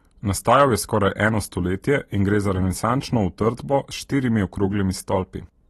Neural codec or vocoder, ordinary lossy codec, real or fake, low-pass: none; AAC, 32 kbps; real; 19.8 kHz